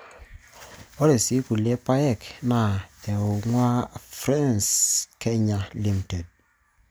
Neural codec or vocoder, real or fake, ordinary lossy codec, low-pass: none; real; none; none